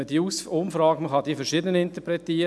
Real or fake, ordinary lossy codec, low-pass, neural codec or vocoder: real; none; none; none